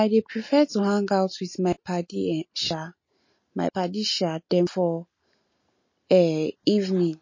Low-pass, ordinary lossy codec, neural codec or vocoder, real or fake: 7.2 kHz; MP3, 32 kbps; none; real